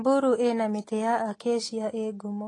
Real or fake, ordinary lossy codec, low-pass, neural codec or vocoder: real; AAC, 32 kbps; 10.8 kHz; none